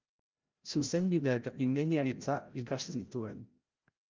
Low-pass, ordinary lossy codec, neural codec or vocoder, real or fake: 7.2 kHz; Opus, 32 kbps; codec, 16 kHz, 0.5 kbps, FreqCodec, larger model; fake